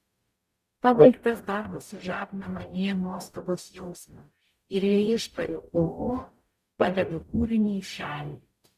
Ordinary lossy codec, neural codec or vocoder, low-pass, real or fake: Opus, 64 kbps; codec, 44.1 kHz, 0.9 kbps, DAC; 14.4 kHz; fake